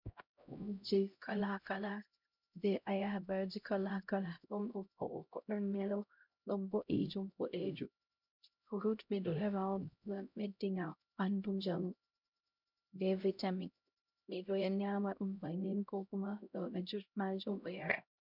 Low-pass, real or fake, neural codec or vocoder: 5.4 kHz; fake; codec, 16 kHz, 0.5 kbps, X-Codec, HuBERT features, trained on LibriSpeech